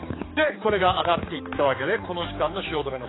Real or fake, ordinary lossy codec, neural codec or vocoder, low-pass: fake; AAC, 16 kbps; codec, 16 kHz, 4 kbps, X-Codec, HuBERT features, trained on general audio; 7.2 kHz